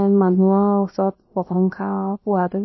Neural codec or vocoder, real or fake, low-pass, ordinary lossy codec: codec, 16 kHz, about 1 kbps, DyCAST, with the encoder's durations; fake; 7.2 kHz; MP3, 24 kbps